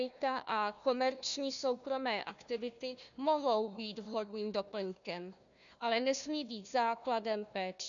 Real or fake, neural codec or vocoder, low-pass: fake; codec, 16 kHz, 1 kbps, FunCodec, trained on Chinese and English, 50 frames a second; 7.2 kHz